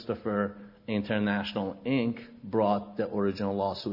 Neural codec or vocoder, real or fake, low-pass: none; real; 5.4 kHz